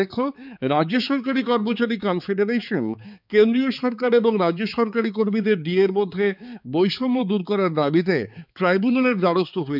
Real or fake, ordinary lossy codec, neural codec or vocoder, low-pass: fake; none; codec, 16 kHz, 4 kbps, X-Codec, HuBERT features, trained on balanced general audio; 5.4 kHz